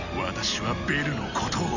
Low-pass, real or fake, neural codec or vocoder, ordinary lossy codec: 7.2 kHz; real; none; none